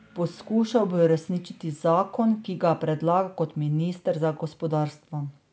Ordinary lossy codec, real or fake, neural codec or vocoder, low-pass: none; real; none; none